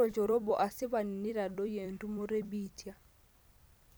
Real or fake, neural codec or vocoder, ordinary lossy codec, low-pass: fake; vocoder, 44.1 kHz, 128 mel bands every 256 samples, BigVGAN v2; none; none